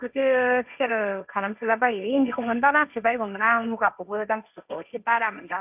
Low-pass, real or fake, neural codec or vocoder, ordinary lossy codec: 3.6 kHz; fake; codec, 16 kHz, 1.1 kbps, Voila-Tokenizer; none